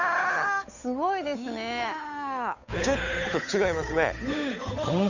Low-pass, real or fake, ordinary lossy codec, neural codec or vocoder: 7.2 kHz; fake; none; codec, 16 kHz, 8 kbps, FunCodec, trained on Chinese and English, 25 frames a second